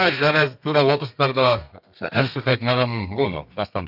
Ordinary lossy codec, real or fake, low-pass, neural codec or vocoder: none; fake; 5.4 kHz; codec, 32 kHz, 1.9 kbps, SNAC